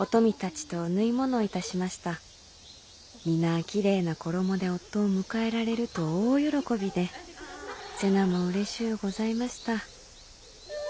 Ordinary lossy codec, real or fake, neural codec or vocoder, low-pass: none; real; none; none